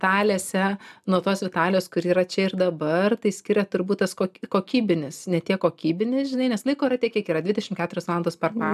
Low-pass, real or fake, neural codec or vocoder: 14.4 kHz; real; none